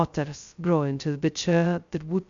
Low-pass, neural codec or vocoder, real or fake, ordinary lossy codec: 7.2 kHz; codec, 16 kHz, 0.2 kbps, FocalCodec; fake; Opus, 64 kbps